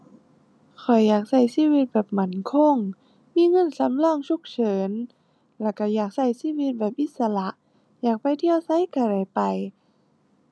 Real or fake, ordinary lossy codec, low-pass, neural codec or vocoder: real; none; none; none